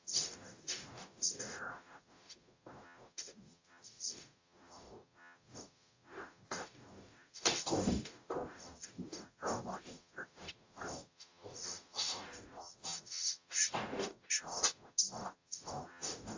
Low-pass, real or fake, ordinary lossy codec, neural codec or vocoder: 7.2 kHz; fake; AAC, 48 kbps; codec, 44.1 kHz, 0.9 kbps, DAC